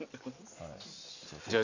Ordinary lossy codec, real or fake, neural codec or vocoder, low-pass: none; real; none; 7.2 kHz